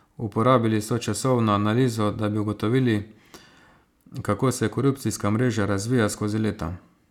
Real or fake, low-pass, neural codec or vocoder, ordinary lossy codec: real; 19.8 kHz; none; none